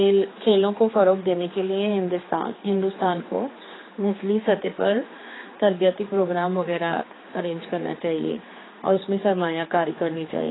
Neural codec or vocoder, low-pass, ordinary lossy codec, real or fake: codec, 16 kHz, 4 kbps, X-Codec, HuBERT features, trained on general audio; 7.2 kHz; AAC, 16 kbps; fake